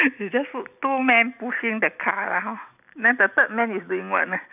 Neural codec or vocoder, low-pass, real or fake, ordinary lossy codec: none; 3.6 kHz; real; none